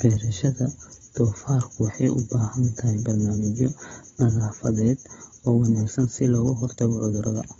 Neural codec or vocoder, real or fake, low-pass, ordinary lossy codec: codec, 16 kHz, 16 kbps, FunCodec, trained on Chinese and English, 50 frames a second; fake; 7.2 kHz; AAC, 24 kbps